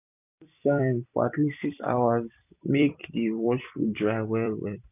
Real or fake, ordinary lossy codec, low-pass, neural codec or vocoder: fake; none; 3.6 kHz; vocoder, 44.1 kHz, 128 mel bands every 256 samples, BigVGAN v2